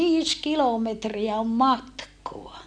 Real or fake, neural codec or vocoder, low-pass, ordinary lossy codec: real; none; 9.9 kHz; none